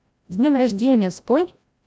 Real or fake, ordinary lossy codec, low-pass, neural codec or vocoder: fake; none; none; codec, 16 kHz, 0.5 kbps, FreqCodec, larger model